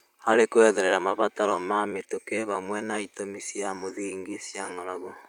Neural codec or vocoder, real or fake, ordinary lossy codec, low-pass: vocoder, 44.1 kHz, 128 mel bands, Pupu-Vocoder; fake; none; 19.8 kHz